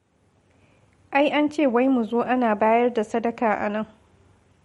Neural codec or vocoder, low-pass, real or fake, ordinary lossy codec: none; 19.8 kHz; real; MP3, 48 kbps